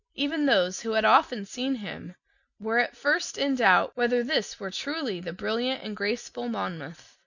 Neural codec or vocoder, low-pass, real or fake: none; 7.2 kHz; real